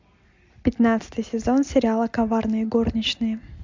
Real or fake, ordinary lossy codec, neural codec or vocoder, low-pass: real; MP3, 64 kbps; none; 7.2 kHz